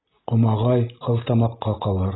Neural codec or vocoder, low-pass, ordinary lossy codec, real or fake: none; 7.2 kHz; AAC, 16 kbps; real